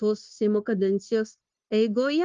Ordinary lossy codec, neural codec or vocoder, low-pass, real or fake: Opus, 32 kbps; codec, 16 kHz, 0.9 kbps, LongCat-Audio-Codec; 7.2 kHz; fake